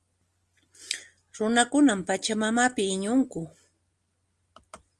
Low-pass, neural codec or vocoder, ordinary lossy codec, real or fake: 10.8 kHz; none; Opus, 24 kbps; real